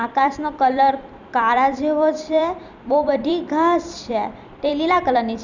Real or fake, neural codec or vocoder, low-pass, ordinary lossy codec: fake; vocoder, 44.1 kHz, 128 mel bands every 256 samples, BigVGAN v2; 7.2 kHz; none